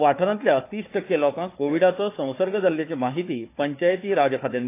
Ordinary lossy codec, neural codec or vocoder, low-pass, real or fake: AAC, 24 kbps; codec, 16 kHz, 4 kbps, FunCodec, trained on Chinese and English, 50 frames a second; 3.6 kHz; fake